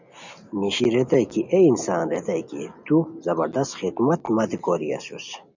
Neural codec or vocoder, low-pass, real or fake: none; 7.2 kHz; real